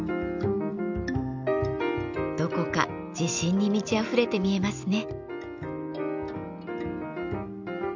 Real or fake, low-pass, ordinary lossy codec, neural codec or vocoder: real; 7.2 kHz; none; none